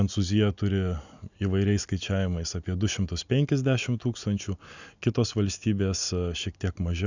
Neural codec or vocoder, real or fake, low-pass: none; real; 7.2 kHz